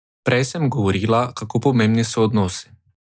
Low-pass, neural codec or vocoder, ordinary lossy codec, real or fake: none; none; none; real